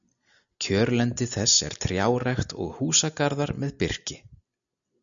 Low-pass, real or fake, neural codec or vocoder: 7.2 kHz; real; none